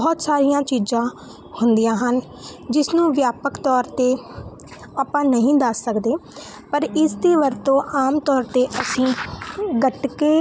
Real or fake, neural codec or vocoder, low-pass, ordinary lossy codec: real; none; none; none